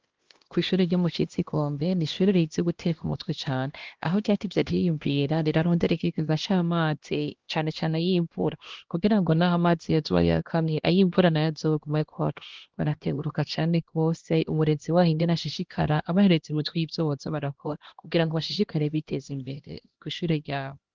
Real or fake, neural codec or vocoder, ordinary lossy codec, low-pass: fake; codec, 16 kHz, 1 kbps, X-Codec, HuBERT features, trained on LibriSpeech; Opus, 16 kbps; 7.2 kHz